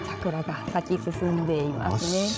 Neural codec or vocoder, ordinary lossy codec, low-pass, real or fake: codec, 16 kHz, 16 kbps, FreqCodec, larger model; none; none; fake